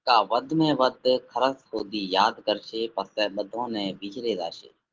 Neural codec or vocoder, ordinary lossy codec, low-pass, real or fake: none; Opus, 16 kbps; 7.2 kHz; real